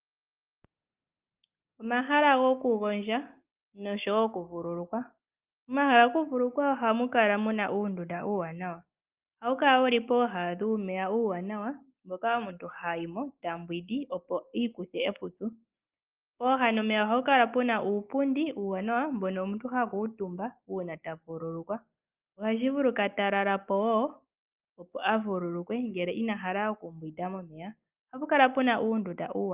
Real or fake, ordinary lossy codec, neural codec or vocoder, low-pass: real; Opus, 24 kbps; none; 3.6 kHz